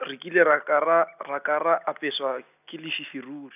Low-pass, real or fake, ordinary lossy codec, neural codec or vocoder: 3.6 kHz; real; none; none